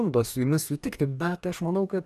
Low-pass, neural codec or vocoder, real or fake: 14.4 kHz; codec, 44.1 kHz, 2.6 kbps, DAC; fake